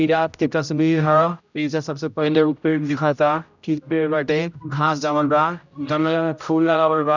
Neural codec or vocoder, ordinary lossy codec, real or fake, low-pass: codec, 16 kHz, 0.5 kbps, X-Codec, HuBERT features, trained on general audio; none; fake; 7.2 kHz